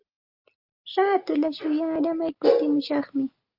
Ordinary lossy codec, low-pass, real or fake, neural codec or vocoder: Opus, 24 kbps; 5.4 kHz; real; none